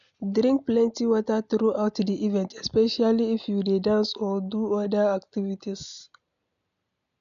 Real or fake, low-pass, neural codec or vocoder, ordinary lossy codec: real; 7.2 kHz; none; none